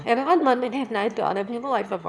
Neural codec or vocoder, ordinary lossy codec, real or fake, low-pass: autoencoder, 22.05 kHz, a latent of 192 numbers a frame, VITS, trained on one speaker; none; fake; none